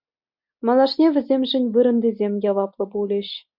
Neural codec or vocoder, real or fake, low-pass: none; real; 5.4 kHz